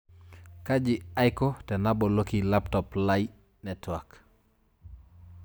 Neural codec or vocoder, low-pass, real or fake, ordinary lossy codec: none; none; real; none